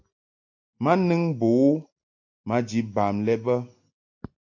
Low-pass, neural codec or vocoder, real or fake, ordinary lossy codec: 7.2 kHz; none; real; AAC, 48 kbps